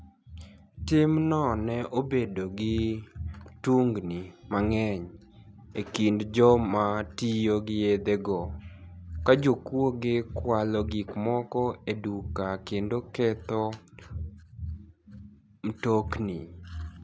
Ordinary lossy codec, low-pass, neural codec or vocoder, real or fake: none; none; none; real